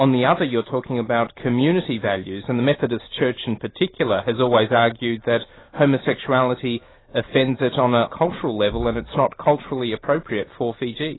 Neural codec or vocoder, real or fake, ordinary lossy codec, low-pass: none; real; AAC, 16 kbps; 7.2 kHz